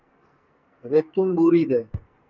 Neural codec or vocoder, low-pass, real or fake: codec, 44.1 kHz, 2.6 kbps, SNAC; 7.2 kHz; fake